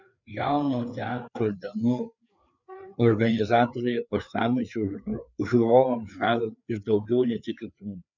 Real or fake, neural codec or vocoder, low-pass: fake; codec, 16 kHz, 4 kbps, FreqCodec, larger model; 7.2 kHz